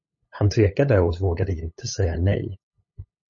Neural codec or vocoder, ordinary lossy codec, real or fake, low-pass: codec, 16 kHz, 8 kbps, FunCodec, trained on LibriTTS, 25 frames a second; MP3, 32 kbps; fake; 7.2 kHz